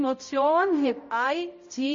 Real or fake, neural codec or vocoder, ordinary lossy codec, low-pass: fake; codec, 16 kHz, 0.5 kbps, X-Codec, HuBERT features, trained on balanced general audio; MP3, 32 kbps; 7.2 kHz